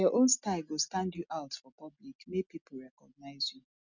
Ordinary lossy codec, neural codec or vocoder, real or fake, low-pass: none; none; real; 7.2 kHz